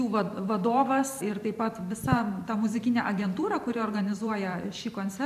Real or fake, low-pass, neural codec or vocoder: fake; 14.4 kHz; vocoder, 44.1 kHz, 128 mel bands every 512 samples, BigVGAN v2